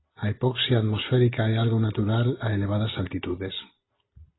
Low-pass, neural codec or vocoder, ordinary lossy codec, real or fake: 7.2 kHz; none; AAC, 16 kbps; real